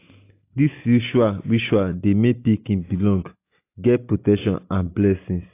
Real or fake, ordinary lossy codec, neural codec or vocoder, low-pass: real; AAC, 24 kbps; none; 3.6 kHz